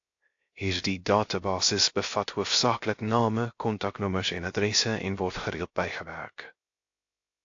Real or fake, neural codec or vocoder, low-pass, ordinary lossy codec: fake; codec, 16 kHz, 0.3 kbps, FocalCodec; 7.2 kHz; AAC, 48 kbps